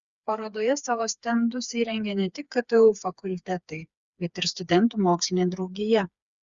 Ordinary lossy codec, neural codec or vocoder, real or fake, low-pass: Opus, 64 kbps; codec, 16 kHz, 4 kbps, FreqCodec, smaller model; fake; 7.2 kHz